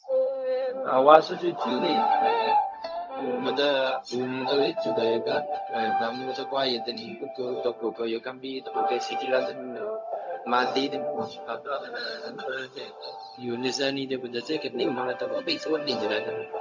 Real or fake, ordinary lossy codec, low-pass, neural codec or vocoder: fake; MP3, 48 kbps; 7.2 kHz; codec, 16 kHz, 0.4 kbps, LongCat-Audio-Codec